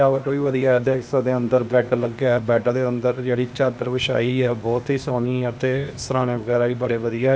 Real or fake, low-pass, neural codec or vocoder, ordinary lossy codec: fake; none; codec, 16 kHz, 0.8 kbps, ZipCodec; none